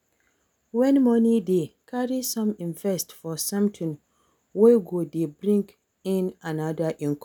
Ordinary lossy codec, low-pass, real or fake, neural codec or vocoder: none; 19.8 kHz; real; none